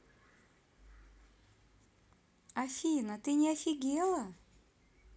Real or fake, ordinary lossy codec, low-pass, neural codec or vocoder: real; none; none; none